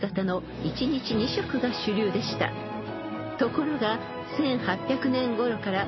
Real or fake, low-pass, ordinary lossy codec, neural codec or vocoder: real; 7.2 kHz; MP3, 24 kbps; none